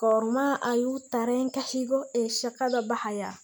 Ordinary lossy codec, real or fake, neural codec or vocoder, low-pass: none; real; none; none